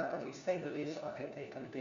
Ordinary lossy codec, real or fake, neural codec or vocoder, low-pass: Opus, 64 kbps; fake; codec, 16 kHz, 1 kbps, FunCodec, trained on LibriTTS, 50 frames a second; 7.2 kHz